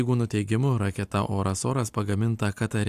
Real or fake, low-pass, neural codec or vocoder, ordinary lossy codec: real; 14.4 kHz; none; AAC, 96 kbps